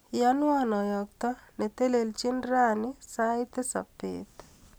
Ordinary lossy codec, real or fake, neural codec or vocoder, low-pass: none; real; none; none